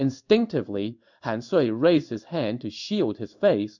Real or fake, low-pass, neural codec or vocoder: fake; 7.2 kHz; codec, 16 kHz in and 24 kHz out, 1 kbps, XY-Tokenizer